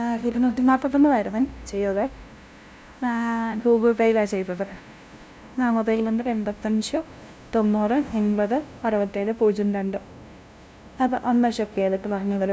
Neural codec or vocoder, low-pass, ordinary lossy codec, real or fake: codec, 16 kHz, 0.5 kbps, FunCodec, trained on LibriTTS, 25 frames a second; none; none; fake